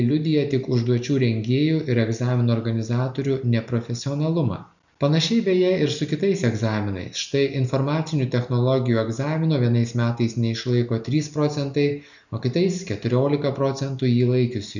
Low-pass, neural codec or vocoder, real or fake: 7.2 kHz; none; real